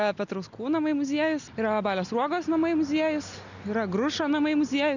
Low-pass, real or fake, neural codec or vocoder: 7.2 kHz; real; none